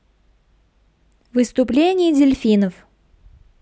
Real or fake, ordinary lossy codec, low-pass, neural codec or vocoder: real; none; none; none